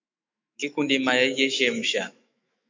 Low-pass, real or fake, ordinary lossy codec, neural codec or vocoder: 7.2 kHz; fake; MP3, 64 kbps; autoencoder, 48 kHz, 128 numbers a frame, DAC-VAE, trained on Japanese speech